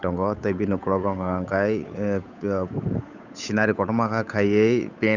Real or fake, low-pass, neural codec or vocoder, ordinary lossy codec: fake; 7.2 kHz; codec, 16 kHz, 8 kbps, FunCodec, trained on Chinese and English, 25 frames a second; none